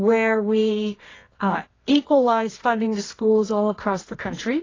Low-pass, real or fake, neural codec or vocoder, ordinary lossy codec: 7.2 kHz; fake; codec, 24 kHz, 0.9 kbps, WavTokenizer, medium music audio release; AAC, 32 kbps